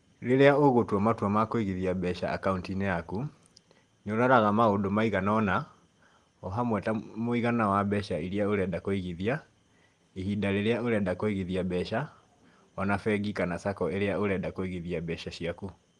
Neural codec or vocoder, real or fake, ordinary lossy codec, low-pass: none; real; Opus, 24 kbps; 10.8 kHz